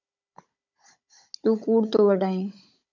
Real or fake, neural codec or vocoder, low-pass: fake; codec, 16 kHz, 16 kbps, FunCodec, trained on Chinese and English, 50 frames a second; 7.2 kHz